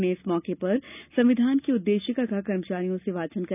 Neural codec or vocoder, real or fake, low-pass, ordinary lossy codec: none; real; 3.6 kHz; none